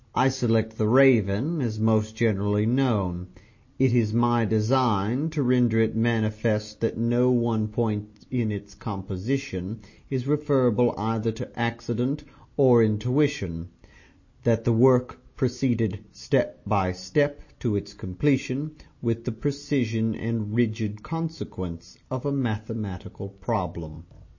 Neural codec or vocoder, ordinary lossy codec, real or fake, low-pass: none; MP3, 32 kbps; real; 7.2 kHz